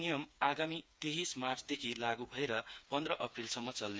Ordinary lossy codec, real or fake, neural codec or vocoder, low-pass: none; fake; codec, 16 kHz, 4 kbps, FreqCodec, smaller model; none